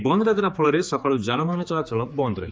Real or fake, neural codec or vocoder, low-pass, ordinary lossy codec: fake; codec, 16 kHz, 4 kbps, X-Codec, HuBERT features, trained on general audio; none; none